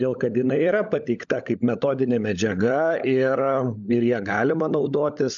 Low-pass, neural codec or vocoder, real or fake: 7.2 kHz; codec, 16 kHz, 16 kbps, FunCodec, trained on LibriTTS, 50 frames a second; fake